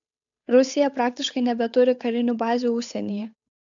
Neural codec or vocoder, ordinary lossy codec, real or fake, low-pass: codec, 16 kHz, 8 kbps, FunCodec, trained on Chinese and English, 25 frames a second; AAC, 64 kbps; fake; 7.2 kHz